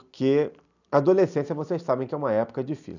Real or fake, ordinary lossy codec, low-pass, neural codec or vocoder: real; none; 7.2 kHz; none